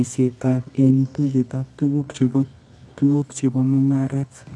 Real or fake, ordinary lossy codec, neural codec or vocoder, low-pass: fake; none; codec, 24 kHz, 0.9 kbps, WavTokenizer, medium music audio release; none